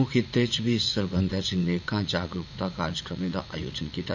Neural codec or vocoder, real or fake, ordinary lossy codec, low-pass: vocoder, 44.1 kHz, 80 mel bands, Vocos; fake; none; 7.2 kHz